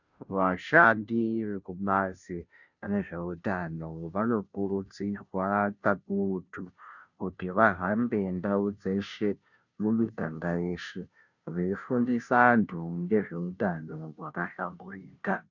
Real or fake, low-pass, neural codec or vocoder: fake; 7.2 kHz; codec, 16 kHz, 0.5 kbps, FunCodec, trained on Chinese and English, 25 frames a second